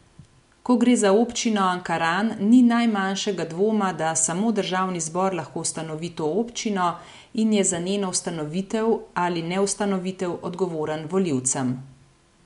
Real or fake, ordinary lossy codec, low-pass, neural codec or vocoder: real; MP3, 64 kbps; 10.8 kHz; none